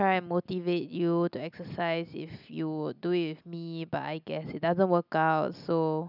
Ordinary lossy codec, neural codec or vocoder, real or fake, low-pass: none; none; real; 5.4 kHz